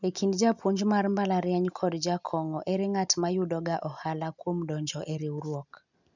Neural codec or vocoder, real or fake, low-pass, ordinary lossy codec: none; real; 7.2 kHz; none